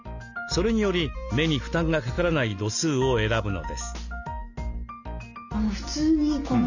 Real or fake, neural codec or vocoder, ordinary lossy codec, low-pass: real; none; none; 7.2 kHz